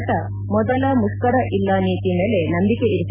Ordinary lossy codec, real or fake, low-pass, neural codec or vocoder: none; real; 3.6 kHz; none